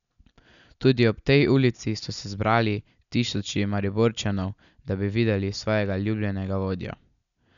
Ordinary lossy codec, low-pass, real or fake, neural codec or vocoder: none; 7.2 kHz; real; none